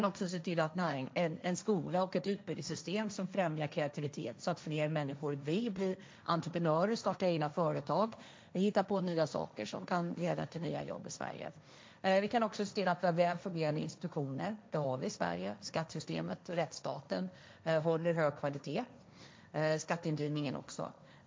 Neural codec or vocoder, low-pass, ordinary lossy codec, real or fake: codec, 16 kHz, 1.1 kbps, Voila-Tokenizer; none; none; fake